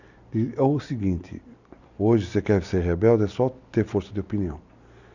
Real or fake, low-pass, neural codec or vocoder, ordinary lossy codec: real; 7.2 kHz; none; none